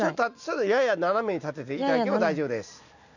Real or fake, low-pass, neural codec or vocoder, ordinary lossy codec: real; 7.2 kHz; none; none